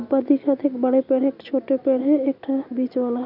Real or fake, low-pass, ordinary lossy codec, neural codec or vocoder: real; 5.4 kHz; none; none